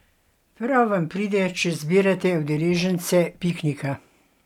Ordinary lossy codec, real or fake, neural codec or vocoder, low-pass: none; real; none; 19.8 kHz